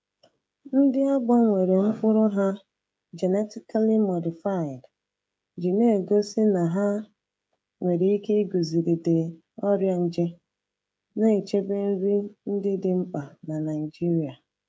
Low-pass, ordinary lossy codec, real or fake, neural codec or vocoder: none; none; fake; codec, 16 kHz, 16 kbps, FreqCodec, smaller model